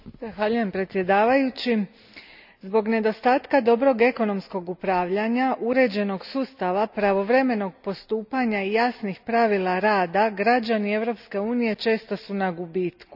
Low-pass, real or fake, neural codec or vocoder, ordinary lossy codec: 5.4 kHz; real; none; none